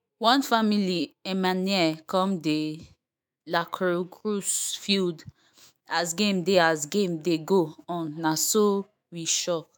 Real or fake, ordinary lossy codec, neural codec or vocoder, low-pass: fake; none; autoencoder, 48 kHz, 128 numbers a frame, DAC-VAE, trained on Japanese speech; none